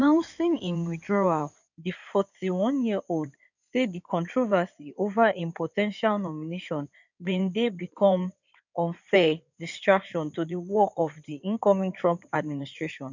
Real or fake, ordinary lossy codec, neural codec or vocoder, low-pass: fake; none; codec, 16 kHz in and 24 kHz out, 2.2 kbps, FireRedTTS-2 codec; 7.2 kHz